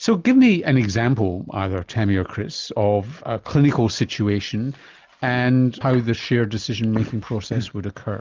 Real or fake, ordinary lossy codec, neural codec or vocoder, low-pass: real; Opus, 24 kbps; none; 7.2 kHz